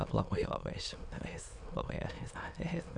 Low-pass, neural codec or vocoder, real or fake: 9.9 kHz; autoencoder, 22.05 kHz, a latent of 192 numbers a frame, VITS, trained on many speakers; fake